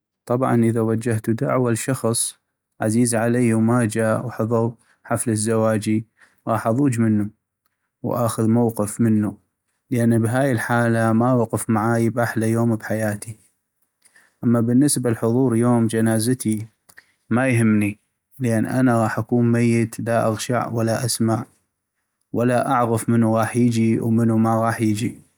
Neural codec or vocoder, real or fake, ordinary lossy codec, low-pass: none; real; none; none